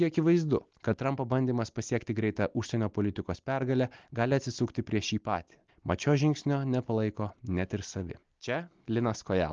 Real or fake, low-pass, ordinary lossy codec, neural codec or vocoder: real; 7.2 kHz; Opus, 24 kbps; none